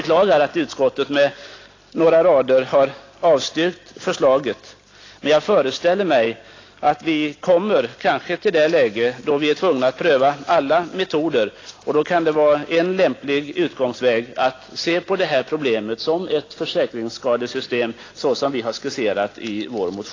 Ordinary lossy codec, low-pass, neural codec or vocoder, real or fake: AAC, 32 kbps; 7.2 kHz; none; real